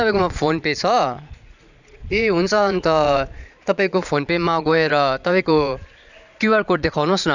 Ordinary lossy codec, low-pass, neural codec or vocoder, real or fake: none; 7.2 kHz; vocoder, 22.05 kHz, 80 mel bands, Vocos; fake